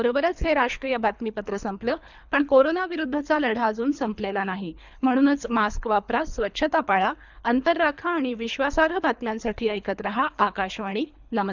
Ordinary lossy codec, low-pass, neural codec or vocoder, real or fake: none; 7.2 kHz; codec, 24 kHz, 3 kbps, HILCodec; fake